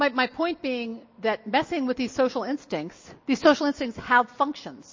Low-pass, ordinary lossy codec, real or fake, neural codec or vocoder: 7.2 kHz; MP3, 32 kbps; real; none